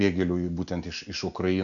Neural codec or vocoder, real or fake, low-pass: none; real; 7.2 kHz